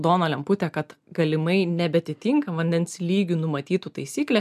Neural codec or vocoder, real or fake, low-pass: none; real; 14.4 kHz